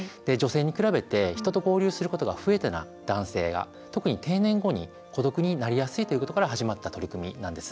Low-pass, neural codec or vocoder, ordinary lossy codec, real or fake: none; none; none; real